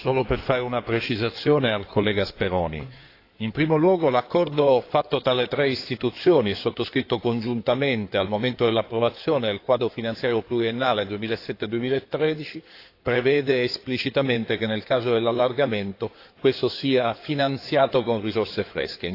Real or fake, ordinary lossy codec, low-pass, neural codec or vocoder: fake; AAC, 32 kbps; 5.4 kHz; codec, 16 kHz in and 24 kHz out, 2.2 kbps, FireRedTTS-2 codec